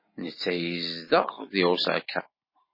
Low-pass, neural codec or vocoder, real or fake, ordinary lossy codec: 5.4 kHz; none; real; MP3, 24 kbps